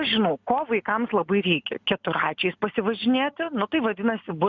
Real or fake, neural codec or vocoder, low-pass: real; none; 7.2 kHz